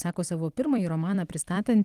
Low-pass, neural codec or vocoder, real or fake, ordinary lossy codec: 14.4 kHz; vocoder, 44.1 kHz, 128 mel bands every 256 samples, BigVGAN v2; fake; Opus, 24 kbps